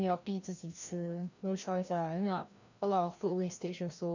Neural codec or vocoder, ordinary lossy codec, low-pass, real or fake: codec, 16 kHz, 1 kbps, FreqCodec, larger model; none; 7.2 kHz; fake